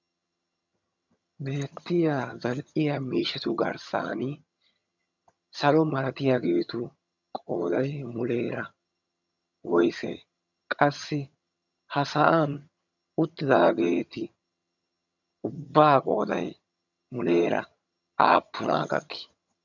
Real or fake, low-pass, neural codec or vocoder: fake; 7.2 kHz; vocoder, 22.05 kHz, 80 mel bands, HiFi-GAN